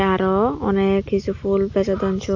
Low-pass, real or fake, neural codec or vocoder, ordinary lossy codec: 7.2 kHz; real; none; AAC, 48 kbps